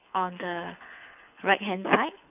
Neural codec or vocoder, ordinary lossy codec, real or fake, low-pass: codec, 24 kHz, 6 kbps, HILCodec; none; fake; 3.6 kHz